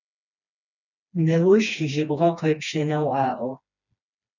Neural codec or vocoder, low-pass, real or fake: codec, 16 kHz, 2 kbps, FreqCodec, smaller model; 7.2 kHz; fake